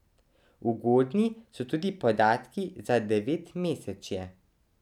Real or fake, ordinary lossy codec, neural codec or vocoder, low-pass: real; none; none; 19.8 kHz